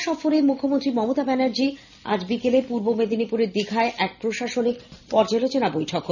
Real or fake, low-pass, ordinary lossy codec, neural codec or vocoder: real; 7.2 kHz; none; none